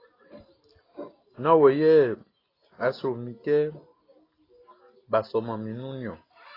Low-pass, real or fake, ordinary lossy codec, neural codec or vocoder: 5.4 kHz; real; AAC, 24 kbps; none